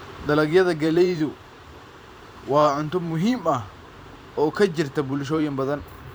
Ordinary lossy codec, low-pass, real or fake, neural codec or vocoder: none; none; fake; vocoder, 44.1 kHz, 128 mel bands every 512 samples, BigVGAN v2